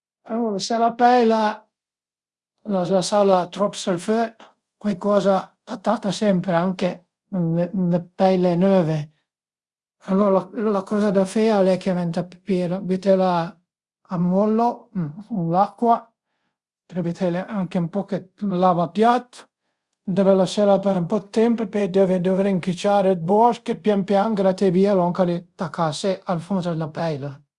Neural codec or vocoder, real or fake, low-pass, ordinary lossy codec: codec, 24 kHz, 0.5 kbps, DualCodec; fake; 10.8 kHz; Opus, 64 kbps